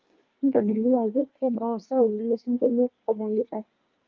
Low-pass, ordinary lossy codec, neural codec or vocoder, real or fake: 7.2 kHz; Opus, 24 kbps; codec, 24 kHz, 1 kbps, SNAC; fake